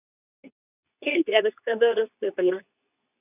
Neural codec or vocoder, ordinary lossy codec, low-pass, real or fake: codec, 24 kHz, 0.9 kbps, WavTokenizer, medium speech release version 2; none; 3.6 kHz; fake